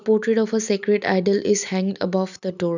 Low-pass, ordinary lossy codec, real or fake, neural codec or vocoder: 7.2 kHz; none; real; none